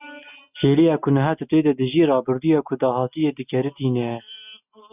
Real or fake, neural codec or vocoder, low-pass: real; none; 3.6 kHz